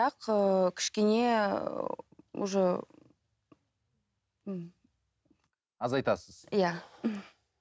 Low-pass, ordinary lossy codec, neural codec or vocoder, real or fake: none; none; none; real